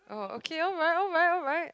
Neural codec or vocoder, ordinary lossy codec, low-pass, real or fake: none; none; none; real